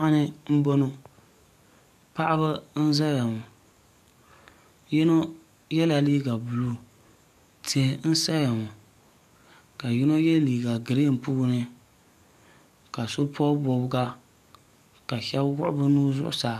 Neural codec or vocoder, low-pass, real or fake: codec, 44.1 kHz, 7.8 kbps, DAC; 14.4 kHz; fake